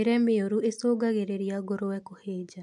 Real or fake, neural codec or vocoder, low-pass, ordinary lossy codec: real; none; 10.8 kHz; none